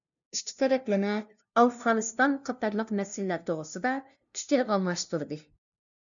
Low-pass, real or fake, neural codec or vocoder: 7.2 kHz; fake; codec, 16 kHz, 0.5 kbps, FunCodec, trained on LibriTTS, 25 frames a second